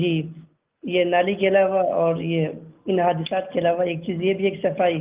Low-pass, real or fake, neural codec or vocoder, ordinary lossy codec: 3.6 kHz; real; none; Opus, 32 kbps